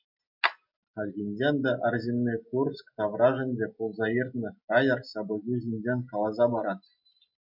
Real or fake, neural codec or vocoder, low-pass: real; none; 5.4 kHz